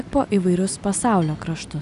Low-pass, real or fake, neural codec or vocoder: 10.8 kHz; real; none